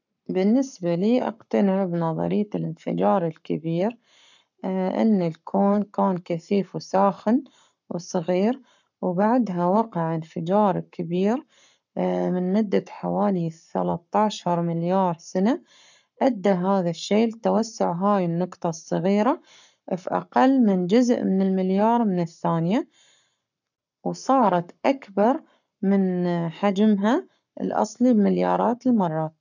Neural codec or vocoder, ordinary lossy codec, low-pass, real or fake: codec, 44.1 kHz, 7.8 kbps, Pupu-Codec; none; 7.2 kHz; fake